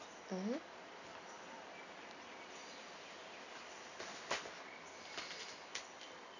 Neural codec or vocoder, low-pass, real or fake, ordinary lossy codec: none; 7.2 kHz; real; none